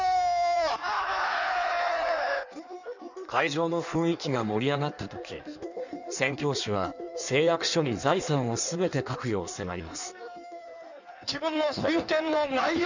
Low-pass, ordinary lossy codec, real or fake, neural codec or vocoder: 7.2 kHz; none; fake; codec, 16 kHz in and 24 kHz out, 1.1 kbps, FireRedTTS-2 codec